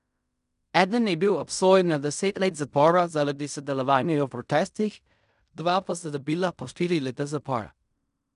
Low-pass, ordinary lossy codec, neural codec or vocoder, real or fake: 10.8 kHz; none; codec, 16 kHz in and 24 kHz out, 0.4 kbps, LongCat-Audio-Codec, fine tuned four codebook decoder; fake